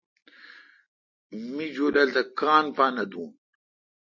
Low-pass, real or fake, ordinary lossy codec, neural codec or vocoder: 7.2 kHz; real; MP3, 32 kbps; none